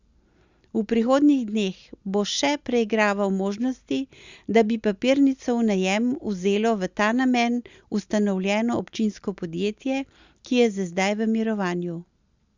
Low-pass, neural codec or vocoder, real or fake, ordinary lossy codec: 7.2 kHz; none; real; Opus, 64 kbps